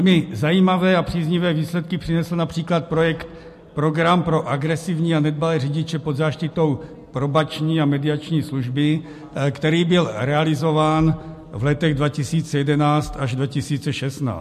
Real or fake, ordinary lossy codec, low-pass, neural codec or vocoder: real; MP3, 64 kbps; 14.4 kHz; none